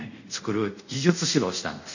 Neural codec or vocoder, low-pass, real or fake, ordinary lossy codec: codec, 24 kHz, 0.5 kbps, DualCodec; 7.2 kHz; fake; none